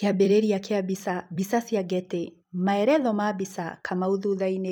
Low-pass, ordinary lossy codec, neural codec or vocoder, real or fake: none; none; vocoder, 44.1 kHz, 128 mel bands every 256 samples, BigVGAN v2; fake